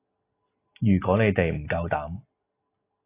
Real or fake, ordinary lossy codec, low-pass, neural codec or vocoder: real; AAC, 24 kbps; 3.6 kHz; none